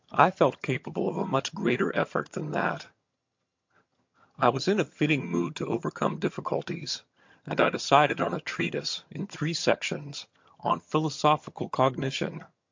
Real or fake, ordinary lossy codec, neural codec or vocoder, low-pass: fake; MP3, 48 kbps; vocoder, 22.05 kHz, 80 mel bands, HiFi-GAN; 7.2 kHz